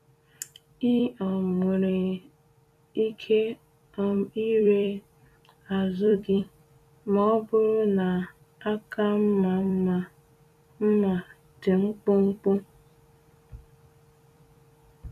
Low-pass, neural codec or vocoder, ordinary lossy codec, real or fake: 14.4 kHz; vocoder, 44.1 kHz, 128 mel bands every 256 samples, BigVGAN v2; none; fake